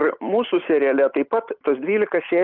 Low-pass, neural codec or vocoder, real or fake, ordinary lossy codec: 5.4 kHz; none; real; Opus, 32 kbps